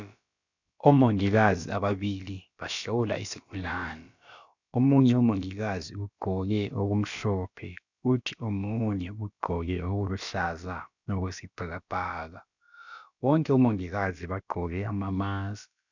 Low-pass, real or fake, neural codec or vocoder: 7.2 kHz; fake; codec, 16 kHz, about 1 kbps, DyCAST, with the encoder's durations